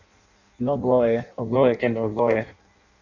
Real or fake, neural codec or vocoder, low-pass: fake; codec, 16 kHz in and 24 kHz out, 0.6 kbps, FireRedTTS-2 codec; 7.2 kHz